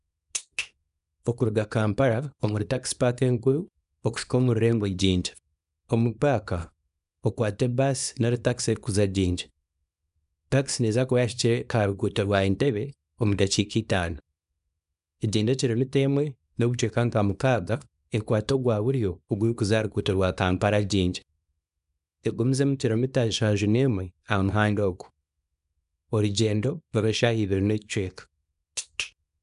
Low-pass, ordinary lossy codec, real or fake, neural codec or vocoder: 10.8 kHz; none; fake; codec, 24 kHz, 0.9 kbps, WavTokenizer, small release